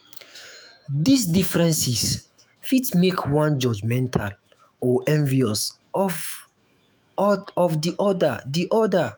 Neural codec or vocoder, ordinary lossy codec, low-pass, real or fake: autoencoder, 48 kHz, 128 numbers a frame, DAC-VAE, trained on Japanese speech; none; none; fake